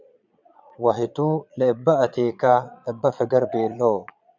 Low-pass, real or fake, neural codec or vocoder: 7.2 kHz; fake; vocoder, 22.05 kHz, 80 mel bands, Vocos